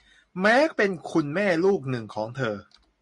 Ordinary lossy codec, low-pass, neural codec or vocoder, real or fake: MP3, 64 kbps; 10.8 kHz; none; real